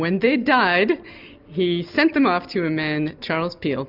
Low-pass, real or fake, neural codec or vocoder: 5.4 kHz; real; none